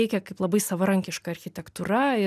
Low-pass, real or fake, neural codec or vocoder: 14.4 kHz; real; none